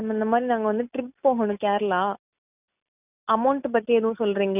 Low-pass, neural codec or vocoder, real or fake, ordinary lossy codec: 3.6 kHz; none; real; none